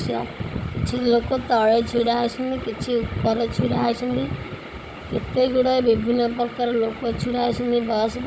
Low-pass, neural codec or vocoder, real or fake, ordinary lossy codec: none; codec, 16 kHz, 16 kbps, FunCodec, trained on Chinese and English, 50 frames a second; fake; none